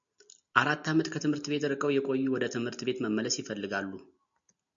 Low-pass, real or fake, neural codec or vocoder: 7.2 kHz; real; none